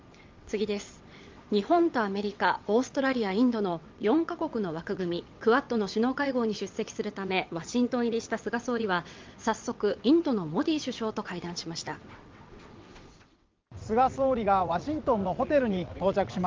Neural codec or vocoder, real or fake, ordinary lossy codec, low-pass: vocoder, 22.05 kHz, 80 mel bands, WaveNeXt; fake; Opus, 32 kbps; 7.2 kHz